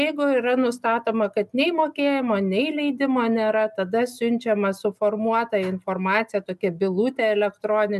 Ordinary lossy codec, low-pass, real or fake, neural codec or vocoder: MP3, 96 kbps; 14.4 kHz; real; none